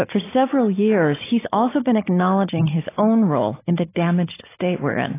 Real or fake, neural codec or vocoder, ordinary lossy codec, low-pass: fake; codec, 16 kHz, 16 kbps, FunCodec, trained on Chinese and English, 50 frames a second; AAC, 16 kbps; 3.6 kHz